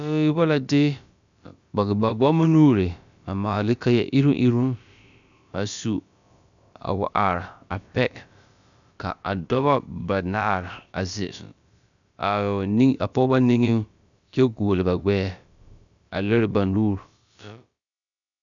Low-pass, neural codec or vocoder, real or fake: 7.2 kHz; codec, 16 kHz, about 1 kbps, DyCAST, with the encoder's durations; fake